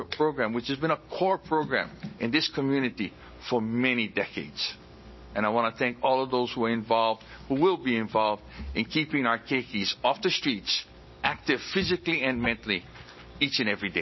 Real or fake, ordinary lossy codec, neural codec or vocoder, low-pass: real; MP3, 24 kbps; none; 7.2 kHz